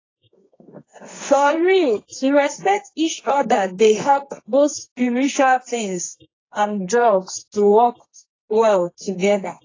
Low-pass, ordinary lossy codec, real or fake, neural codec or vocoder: 7.2 kHz; AAC, 32 kbps; fake; codec, 24 kHz, 0.9 kbps, WavTokenizer, medium music audio release